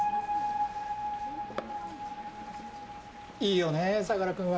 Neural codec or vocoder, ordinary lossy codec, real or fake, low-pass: none; none; real; none